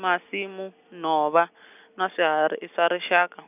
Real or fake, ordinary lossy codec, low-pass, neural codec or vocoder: real; none; 3.6 kHz; none